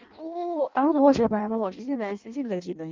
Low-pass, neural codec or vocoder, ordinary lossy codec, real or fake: 7.2 kHz; codec, 16 kHz in and 24 kHz out, 0.6 kbps, FireRedTTS-2 codec; Opus, 32 kbps; fake